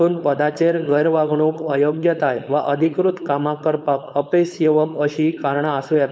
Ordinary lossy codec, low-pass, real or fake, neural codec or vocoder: none; none; fake; codec, 16 kHz, 4.8 kbps, FACodec